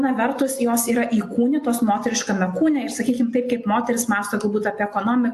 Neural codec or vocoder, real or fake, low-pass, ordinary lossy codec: none; real; 14.4 kHz; AAC, 64 kbps